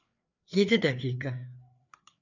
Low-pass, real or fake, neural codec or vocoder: 7.2 kHz; fake; codec, 16 kHz, 4 kbps, FreqCodec, larger model